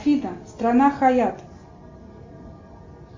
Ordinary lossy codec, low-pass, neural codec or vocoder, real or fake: MP3, 48 kbps; 7.2 kHz; none; real